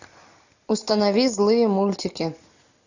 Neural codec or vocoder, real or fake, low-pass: none; real; 7.2 kHz